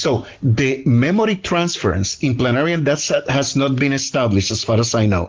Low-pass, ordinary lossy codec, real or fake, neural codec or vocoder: 7.2 kHz; Opus, 16 kbps; real; none